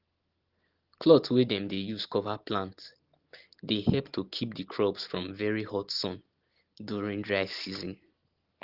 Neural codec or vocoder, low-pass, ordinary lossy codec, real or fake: none; 5.4 kHz; Opus, 16 kbps; real